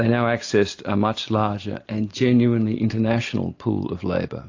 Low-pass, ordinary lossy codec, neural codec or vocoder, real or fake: 7.2 kHz; AAC, 48 kbps; none; real